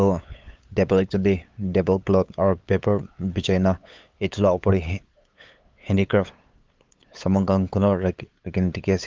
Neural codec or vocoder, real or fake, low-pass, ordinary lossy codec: codec, 16 kHz, 8 kbps, FunCodec, trained on LibriTTS, 25 frames a second; fake; 7.2 kHz; Opus, 24 kbps